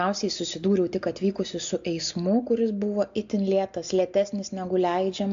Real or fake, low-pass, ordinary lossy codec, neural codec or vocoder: real; 7.2 kHz; AAC, 64 kbps; none